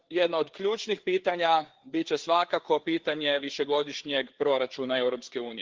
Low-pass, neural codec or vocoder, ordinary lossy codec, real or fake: 7.2 kHz; codec, 16 kHz, 4 kbps, FreqCodec, larger model; Opus, 16 kbps; fake